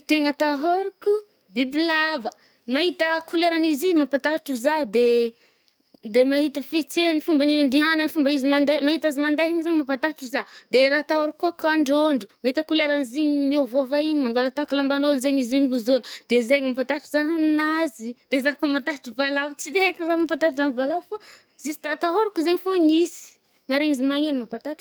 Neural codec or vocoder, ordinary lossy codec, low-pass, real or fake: codec, 44.1 kHz, 2.6 kbps, SNAC; none; none; fake